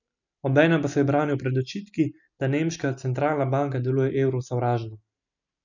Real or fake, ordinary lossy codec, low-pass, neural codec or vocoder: real; none; 7.2 kHz; none